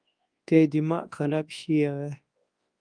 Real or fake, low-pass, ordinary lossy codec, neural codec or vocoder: fake; 9.9 kHz; Opus, 32 kbps; codec, 24 kHz, 0.9 kbps, WavTokenizer, large speech release